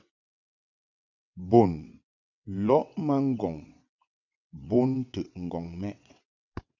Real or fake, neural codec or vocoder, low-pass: fake; vocoder, 22.05 kHz, 80 mel bands, WaveNeXt; 7.2 kHz